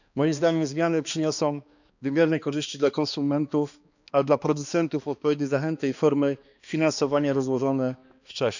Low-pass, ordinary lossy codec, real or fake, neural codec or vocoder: 7.2 kHz; none; fake; codec, 16 kHz, 2 kbps, X-Codec, HuBERT features, trained on balanced general audio